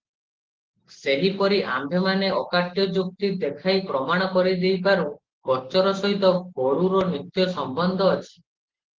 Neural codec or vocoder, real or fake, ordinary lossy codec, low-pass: none; real; Opus, 16 kbps; 7.2 kHz